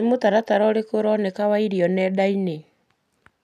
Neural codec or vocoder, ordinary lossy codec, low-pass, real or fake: none; none; 14.4 kHz; real